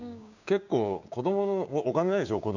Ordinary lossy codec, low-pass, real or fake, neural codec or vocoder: none; 7.2 kHz; fake; codec, 16 kHz, 6 kbps, DAC